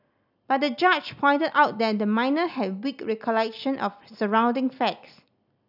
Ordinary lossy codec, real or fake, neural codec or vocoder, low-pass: none; real; none; 5.4 kHz